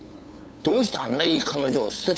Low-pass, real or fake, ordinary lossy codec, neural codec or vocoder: none; fake; none; codec, 16 kHz, 8 kbps, FunCodec, trained on LibriTTS, 25 frames a second